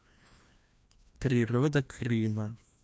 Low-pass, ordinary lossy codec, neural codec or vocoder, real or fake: none; none; codec, 16 kHz, 1 kbps, FreqCodec, larger model; fake